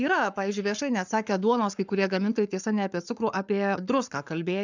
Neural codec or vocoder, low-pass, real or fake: codec, 44.1 kHz, 7.8 kbps, Pupu-Codec; 7.2 kHz; fake